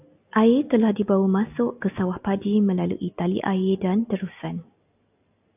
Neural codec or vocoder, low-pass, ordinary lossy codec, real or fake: none; 3.6 kHz; AAC, 32 kbps; real